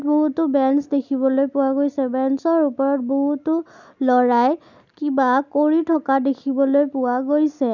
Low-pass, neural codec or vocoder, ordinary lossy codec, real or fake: 7.2 kHz; none; none; real